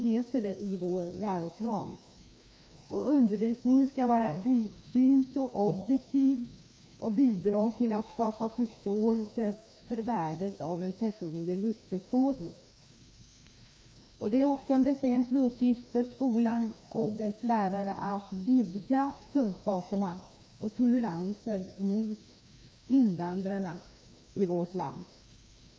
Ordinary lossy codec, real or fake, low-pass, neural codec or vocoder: none; fake; none; codec, 16 kHz, 1 kbps, FreqCodec, larger model